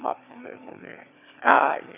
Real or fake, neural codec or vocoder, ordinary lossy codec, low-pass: fake; autoencoder, 22.05 kHz, a latent of 192 numbers a frame, VITS, trained on one speaker; none; 3.6 kHz